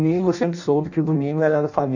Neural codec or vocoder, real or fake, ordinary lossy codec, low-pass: codec, 16 kHz in and 24 kHz out, 0.6 kbps, FireRedTTS-2 codec; fake; none; 7.2 kHz